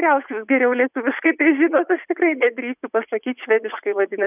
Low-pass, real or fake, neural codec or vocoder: 3.6 kHz; fake; vocoder, 44.1 kHz, 80 mel bands, Vocos